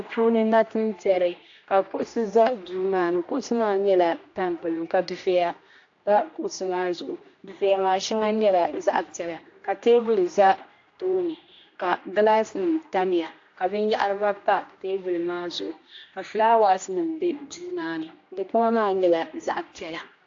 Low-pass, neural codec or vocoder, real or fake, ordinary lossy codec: 7.2 kHz; codec, 16 kHz, 1 kbps, X-Codec, HuBERT features, trained on general audio; fake; AAC, 48 kbps